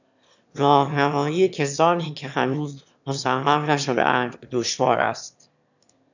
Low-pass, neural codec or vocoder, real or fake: 7.2 kHz; autoencoder, 22.05 kHz, a latent of 192 numbers a frame, VITS, trained on one speaker; fake